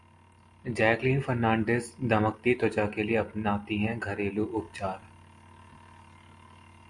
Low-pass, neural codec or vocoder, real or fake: 10.8 kHz; none; real